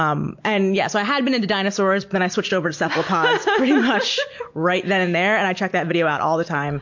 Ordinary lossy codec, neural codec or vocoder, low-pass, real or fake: MP3, 48 kbps; none; 7.2 kHz; real